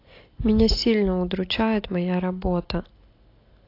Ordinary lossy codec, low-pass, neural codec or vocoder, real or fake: AAC, 32 kbps; 5.4 kHz; none; real